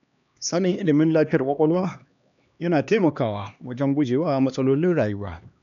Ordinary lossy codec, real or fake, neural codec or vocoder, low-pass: none; fake; codec, 16 kHz, 2 kbps, X-Codec, HuBERT features, trained on LibriSpeech; 7.2 kHz